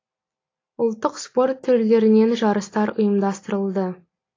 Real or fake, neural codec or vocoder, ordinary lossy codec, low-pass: real; none; AAC, 32 kbps; 7.2 kHz